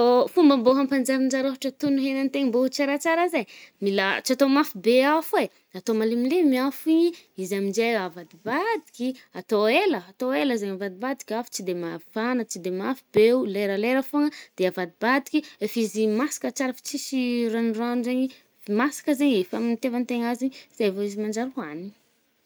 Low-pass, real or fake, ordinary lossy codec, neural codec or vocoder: none; real; none; none